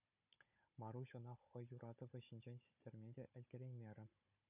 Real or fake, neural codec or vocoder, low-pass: fake; codec, 16 kHz, 16 kbps, FunCodec, trained on Chinese and English, 50 frames a second; 3.6 kHz